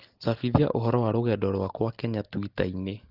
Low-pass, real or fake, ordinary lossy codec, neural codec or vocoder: 5.4 kHz; real; Opus, 16 kbps; none